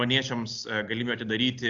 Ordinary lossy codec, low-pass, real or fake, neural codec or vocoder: AAC, 64 kbps; 7.2 kHz; real; none